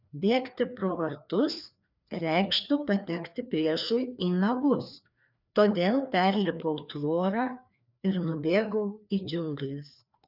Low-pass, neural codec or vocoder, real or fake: 5.4 kHz; codec, 16 kHz, 2 kbps, FreqCodec, larger model; fake